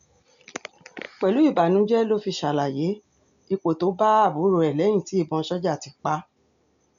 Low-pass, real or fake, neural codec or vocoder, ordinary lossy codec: 7.2 kHz; real; none; none